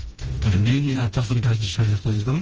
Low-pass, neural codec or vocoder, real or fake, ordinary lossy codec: 7.2 kHz; codec, 16 kHz, 1 kbps, FreqCodec, smaller model; fake; Opus, 24 kbps